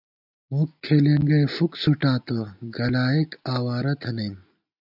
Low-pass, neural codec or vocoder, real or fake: 5.4 kHz; none; real